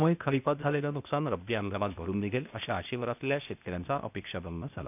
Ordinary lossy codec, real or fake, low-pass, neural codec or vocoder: none; fake; 3.6 kHz; codec, 16 kHz, 0.8 kbps, ZipCodec